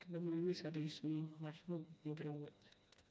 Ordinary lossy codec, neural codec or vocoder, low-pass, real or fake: none; codec, 16 kHz, 1 kbps, FreqCodec, smaller model; none; fake